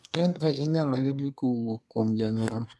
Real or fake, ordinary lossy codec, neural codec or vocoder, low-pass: fake; none; codec, 24 kHz, 1 kbps, SNAC; none